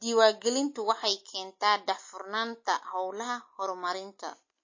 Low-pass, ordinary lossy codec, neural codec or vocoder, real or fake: 7.2 kHz; MP3, 32 kbps; none; real